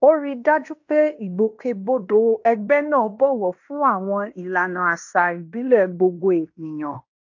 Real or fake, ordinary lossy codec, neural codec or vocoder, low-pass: fake; AAC, 48 kbps; codec, 16 kHz in and 24 kHz out, 0.9 kbps, LongCat-Audio-Codec, fine tuned four codebook decoder; 7.2 kHz